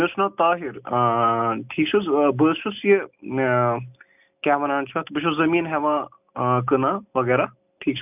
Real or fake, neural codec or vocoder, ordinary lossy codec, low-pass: real; none; none; 3.6 kHz